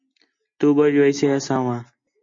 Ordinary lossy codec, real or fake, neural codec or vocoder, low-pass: MP3, 48 kbps; real; none; 7.2 kHz